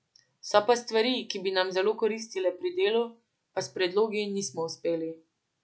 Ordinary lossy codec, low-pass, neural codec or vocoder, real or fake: none; none; none; real